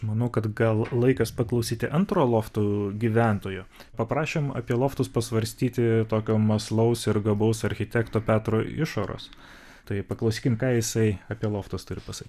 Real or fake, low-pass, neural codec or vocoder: real; 14.4 kHz; none